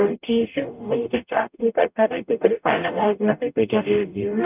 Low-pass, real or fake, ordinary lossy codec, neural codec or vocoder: 3.6 kHz; fake; none; codec, 44.1 kHz, 0.9 kbps, DAC